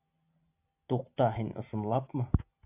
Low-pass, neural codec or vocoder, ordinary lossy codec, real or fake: 3.6 kHz; none; AAC, 32 kbps; real